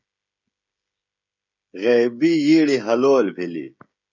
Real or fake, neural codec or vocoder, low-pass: fake; codec, 16 kHz, 16 kbps, FreqCodec, smaller model; 7.2 kHz